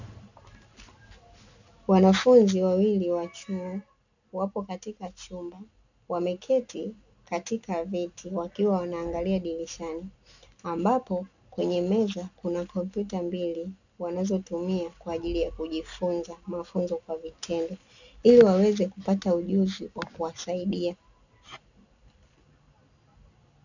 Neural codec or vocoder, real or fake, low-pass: none; real; 7.2 kHz